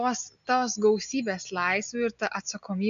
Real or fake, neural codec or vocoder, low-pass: real; none; 7.2 kHz